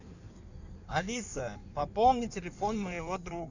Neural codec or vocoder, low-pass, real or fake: codec, 16 kHz in and 24 kHz out, 1.1 kbps, FireRedTTS-2 codec; 7.2 kHz; fake